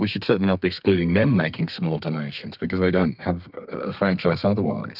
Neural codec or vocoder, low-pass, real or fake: codec, 32 kHz, 1.9 kbps, SNAC; 5.4 kHz; fake